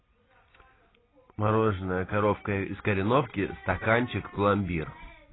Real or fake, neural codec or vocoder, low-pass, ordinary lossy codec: real; none; 7.2 kHz; AAC, 16 kbps